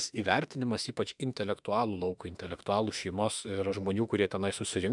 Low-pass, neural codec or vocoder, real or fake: 10.8 kHz; autoencoder, 48 kHz, 32 numbers a frame, DAC-VAE, trained on Japanese speech; fake